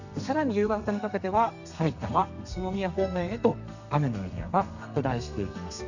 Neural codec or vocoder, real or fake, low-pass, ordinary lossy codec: codec, 32 kHz, 1.9 kbps, SNAC; fake; 7.2 kHz; none